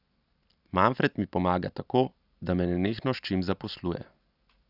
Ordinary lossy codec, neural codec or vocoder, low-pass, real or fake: none; none; 5.4 kHz; real